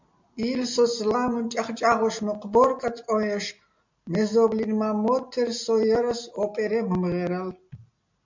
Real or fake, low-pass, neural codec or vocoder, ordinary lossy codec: real; 7.2 kHz; none; MP3, 64 kbps